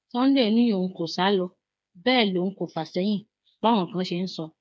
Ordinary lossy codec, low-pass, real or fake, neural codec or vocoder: none; none; fake; codec, 16 kHz, 4 kbps, FreqCodec, smaller model